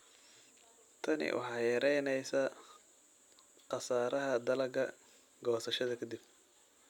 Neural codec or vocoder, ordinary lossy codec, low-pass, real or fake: none; none; 19.8 kHz; real